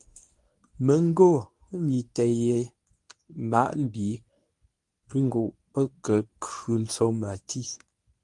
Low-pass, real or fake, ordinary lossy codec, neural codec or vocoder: 10.8 kHz; fake; Opus, 32 kbps; codec, 24 kHz, 0.9 kbps, WavTokenizer, medium speech release version 1